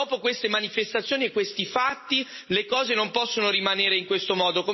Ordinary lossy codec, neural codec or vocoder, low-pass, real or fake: MP3, 24 kbps; none; 7.2 kHz; real